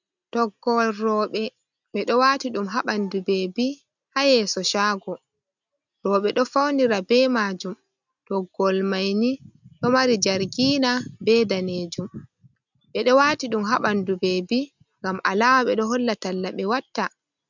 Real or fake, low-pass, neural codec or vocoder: real; 7.2 kHz; none